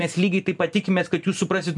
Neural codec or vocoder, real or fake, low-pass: none; real; 10.8 kHz